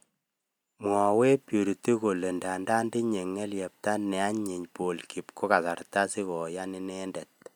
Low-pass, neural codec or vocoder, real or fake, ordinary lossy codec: none; none; real; none